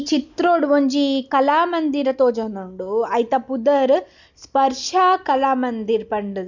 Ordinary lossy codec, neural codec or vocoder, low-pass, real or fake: none; none; 7.2 kHz; real